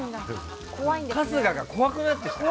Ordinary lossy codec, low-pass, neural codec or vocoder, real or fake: none; none; none; real